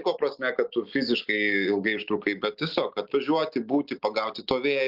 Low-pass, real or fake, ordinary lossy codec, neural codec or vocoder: 5.4 kHz; real; Opus, 24 kbps; none